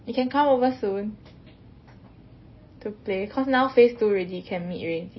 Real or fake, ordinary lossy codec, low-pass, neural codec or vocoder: real; MP3, 24 kbps; 7.2 kHz; none